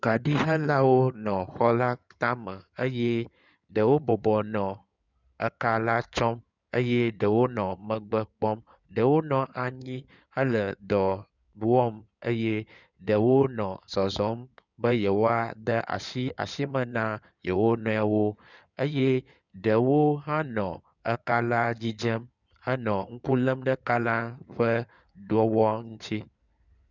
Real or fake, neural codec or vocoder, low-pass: fake; codec, 16 kHz in and 24 kHz out, 2.2 kbps, FireRedTTS-2 codec; 7.2 kHz